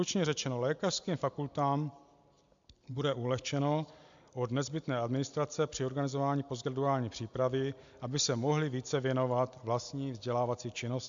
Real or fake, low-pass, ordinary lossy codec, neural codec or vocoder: real; 7.2 kHz; MP3, 64 kbps; none